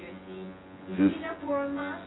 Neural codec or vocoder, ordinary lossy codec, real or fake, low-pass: vocoder, 24 kHz, 100 mel bands, Vocos; AAC, 16 kbps; fake; 7.2 kHz